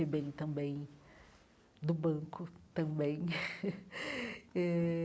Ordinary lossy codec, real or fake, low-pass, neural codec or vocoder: none; real; none; none